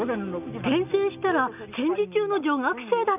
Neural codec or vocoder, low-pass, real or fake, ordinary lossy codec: none; 3.6 kHz; real; none